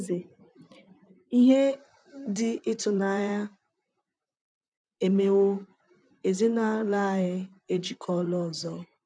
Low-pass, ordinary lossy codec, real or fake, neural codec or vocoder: 9.9 kHz; none; fake; vocoder, 44.1 kHz, 128 mel bands, Pupu-Vocoder